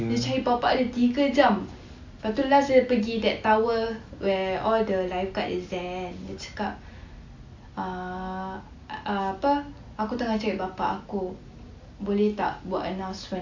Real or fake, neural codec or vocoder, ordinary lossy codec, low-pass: real; none; AAC, 48 kbps; 7.2 kHz